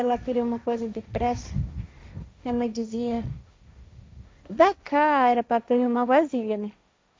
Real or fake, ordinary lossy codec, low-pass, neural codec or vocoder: fake; none; none; codec, 16 kHz, 1.1 kbps, Voila-Tokenizer